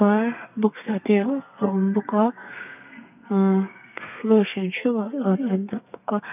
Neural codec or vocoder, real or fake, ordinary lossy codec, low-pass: codec, 32 kHz, 1.9 kbps, SNAC; fake; none; 3.6 kHz